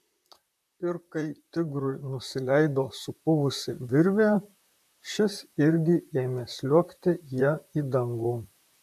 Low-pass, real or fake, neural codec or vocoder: 14.4 kHz; fake; vocoder, 44.1 kHz, 128 mel bands, Pupu-Vocoder